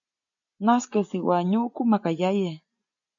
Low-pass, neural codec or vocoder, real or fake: 7.2 kHz; none; real